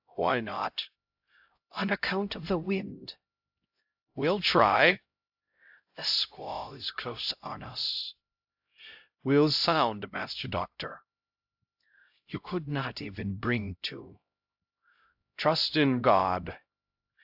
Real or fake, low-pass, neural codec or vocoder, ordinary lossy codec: fake; 5.4 kHz; codec, 16 kHz, 0.5 kbps, X-Codec, HuBERT features, trained on LibriSpeech; AAC, 48 kbps